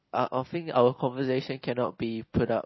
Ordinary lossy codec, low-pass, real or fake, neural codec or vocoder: MP3, 24 kbps; 7.2 kHz; real; none